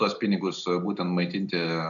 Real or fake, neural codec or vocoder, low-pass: real; none; 7.2 kHz